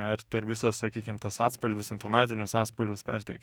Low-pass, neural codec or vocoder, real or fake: 19.8 kHz; codec, 44.1 kHz, 2.6 kbps, DAC; fake